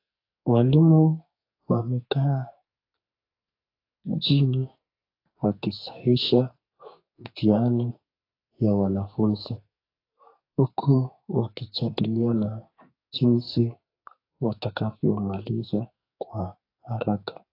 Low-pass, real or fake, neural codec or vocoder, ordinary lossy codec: 5.4 kHz; fake; codec, 44.1 kHz, 2.6 kbps, SNAC; AAC, 32 kbps